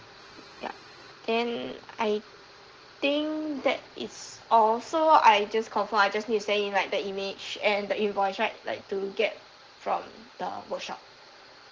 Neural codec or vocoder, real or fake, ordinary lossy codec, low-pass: none; real; Opus, 16 kbps; 7.2 kHz